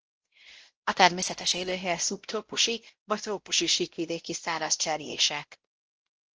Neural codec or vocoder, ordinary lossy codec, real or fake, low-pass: codec, 16 kHz, 0.5 kbps, X-Codec, WavLM features, trained on Multilingual LibriSpeech; Opus, 16 kbps; fake; 7.2 kHz